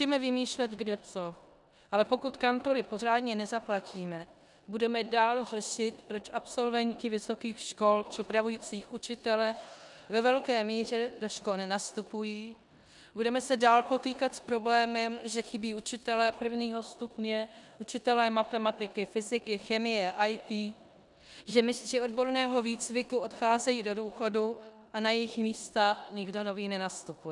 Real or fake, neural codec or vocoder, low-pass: fake; codec, 16 kHz in and 24 kHz out, 0.9 kbps, LongCat-Audio-Codec, four codebook decoder; 10.8 kHz